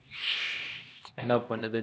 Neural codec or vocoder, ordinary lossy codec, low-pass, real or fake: codec, 16 kHz, 1 kbps, X-Codec, HuBERT features, trained on LibriSpeech; none; none; fake